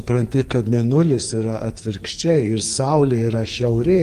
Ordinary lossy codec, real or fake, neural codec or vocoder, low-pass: Opus, 32 kbps; fake; codec, 44.1 kHz, 2.6 kbps, SNAC; 14.4 kHz